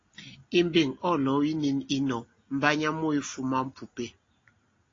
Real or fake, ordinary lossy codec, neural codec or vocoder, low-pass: real; AAC, 32 kbps; none; 7.2 kHz